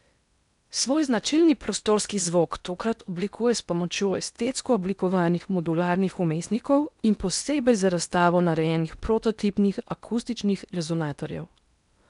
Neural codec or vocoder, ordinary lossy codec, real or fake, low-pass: codec, 16 kHz in and 24 kHz out, 0.8 kbps, FocalCodec, streaming, 65536 codes; none; fake; 10.8 kHz